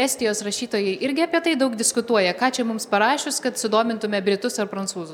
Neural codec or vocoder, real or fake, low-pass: none; real; 19.8 kHz